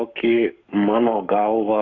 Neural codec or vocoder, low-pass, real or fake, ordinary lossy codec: codec, 44.1 kHz, 7.8 kbps, Pupu-Codec; 7.2 kHz; fake; MP3, 64 kbps